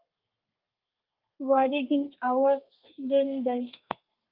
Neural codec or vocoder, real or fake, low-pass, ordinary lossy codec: codec, 32 kHz, 1.9 kbps, SNAC; fake; 5.4 kHz; Opus, 24 kbps